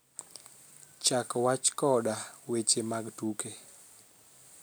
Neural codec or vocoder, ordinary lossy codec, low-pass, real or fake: none; none; none; real